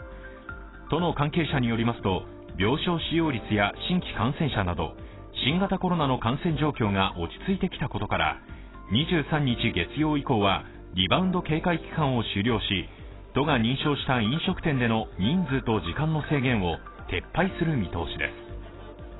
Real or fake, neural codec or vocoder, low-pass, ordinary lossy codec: real; none; 7.2 kHz; AAC, 16 kbps